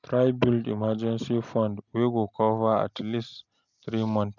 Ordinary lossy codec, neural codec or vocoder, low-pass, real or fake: none; none; 7.2 kHz; real